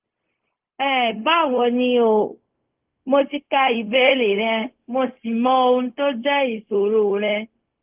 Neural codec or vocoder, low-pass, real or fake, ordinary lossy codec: codec, 16 kHz, 0.4 kbps, LongCat-Audio-Codec; 3.6 kHz; fake; Opus, 32 kbps